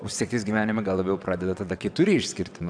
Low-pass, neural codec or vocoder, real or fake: 9.9 kHz; vocoder, 22.05 kHz, 80 mel bands, WaveNeXt; fake